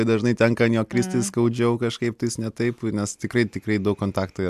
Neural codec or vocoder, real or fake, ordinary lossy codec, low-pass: none; real; MP3, 96 kbps; 14.4 kHz